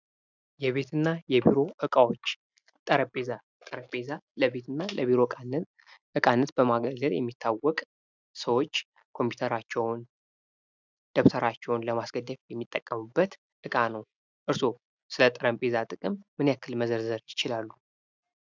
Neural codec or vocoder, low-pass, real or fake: none; 7.2 kHz; real